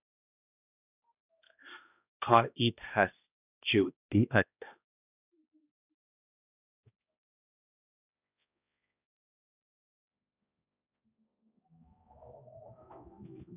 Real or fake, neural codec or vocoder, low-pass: fake; codec, 16 kHz, 1 kbps, X-Codec, HuBERT features, trained on balanced general audio; 3.6 kHz